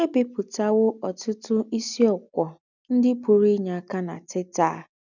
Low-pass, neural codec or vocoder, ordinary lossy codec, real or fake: 7.2 kHz; none; none; real